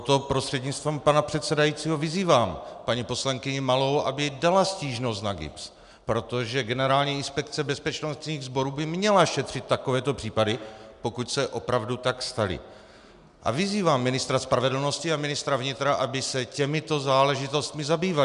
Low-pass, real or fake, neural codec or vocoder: 10.8 kHz; real; none